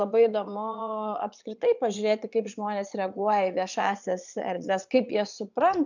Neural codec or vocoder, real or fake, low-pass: vocoder, 22.05 kHz, 80 mel bands, Vocos; fake; 7.2 kHz